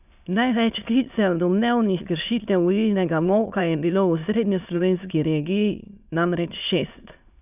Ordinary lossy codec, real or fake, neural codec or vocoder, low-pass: none; fake; autoencoder, 22.05 kHz, a latent of 192 numbers a frame, VITS, trained on many speakers; 3.6 kHz